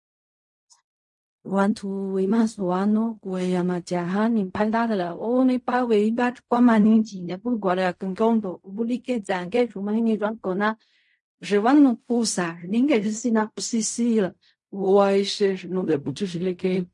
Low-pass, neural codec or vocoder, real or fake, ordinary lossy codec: 10.8 kHz; codec, 16 kHz in and 24 kHz out, 0.4 kbps, LongCat-Audio-Codec, fine tuned four codebook decoder; fake; MP3, 48 kbps